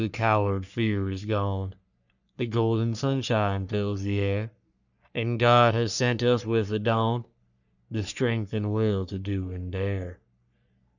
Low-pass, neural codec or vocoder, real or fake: 7.2 kHz; codec, 44.1 kHz, 3.4 kbps, Pupu-Codec; fake